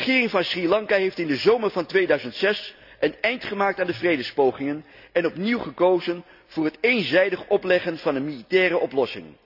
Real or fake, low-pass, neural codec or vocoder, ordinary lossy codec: real; 5.4 kHz; none; none